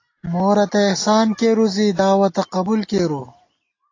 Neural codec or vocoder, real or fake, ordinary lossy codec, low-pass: none; real; AAC, 32 kbps; 7.2 kHz